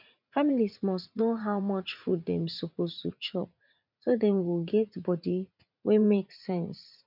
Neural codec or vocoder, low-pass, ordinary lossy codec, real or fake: vocoder, 44.1 kHz, 80 mel bands, Vocos; 5.4 kHz; none; fake